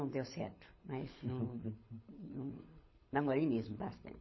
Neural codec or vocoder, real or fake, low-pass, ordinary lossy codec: codec, 24 kHz, 6 kbps, HILCodec; fake; 7.2 kHz; MP3, 24 kbps